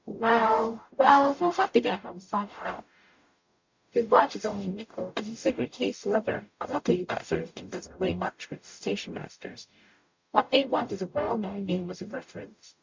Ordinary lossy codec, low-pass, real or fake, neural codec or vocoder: MP3, 64 kbps; 7.2 kHz; fake; codec, 44.1 kHz, 0.9 kbps, DAC